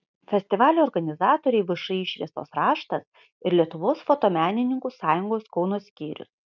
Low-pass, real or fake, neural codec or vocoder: 7.2 kHz; real; none